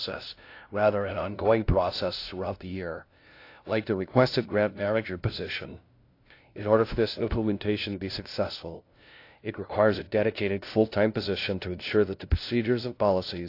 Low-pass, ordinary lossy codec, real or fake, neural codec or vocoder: 5.4 kHz; AAC, 32 kbps; fake; codec, 16 kHz, 0.5 kbps, FunCodec, trained on LibriTTS, 25 frames a second